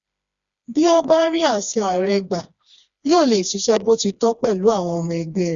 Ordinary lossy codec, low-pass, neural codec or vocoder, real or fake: Opus, 64 kbps; 7.2 kHz; codec, 16 kHz, 2 kbps, FreqCodec, smaller model; fake